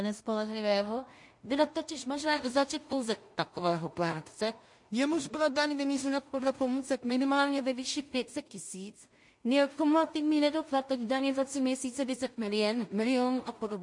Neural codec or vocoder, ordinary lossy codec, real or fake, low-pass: codec, 16 kHz in and 24 kHz out, 0.4 kbps, LongCat-Audio-Codec, two codebook decoder; MP3, 48 kbps; fake; 10.8 kHz